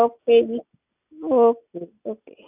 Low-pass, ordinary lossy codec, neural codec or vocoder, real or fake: 3.6 kHz; none; none; real